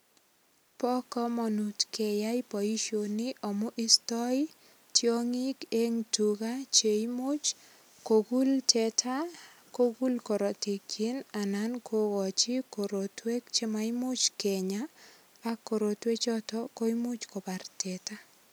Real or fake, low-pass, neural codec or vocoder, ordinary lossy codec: real; none; none; none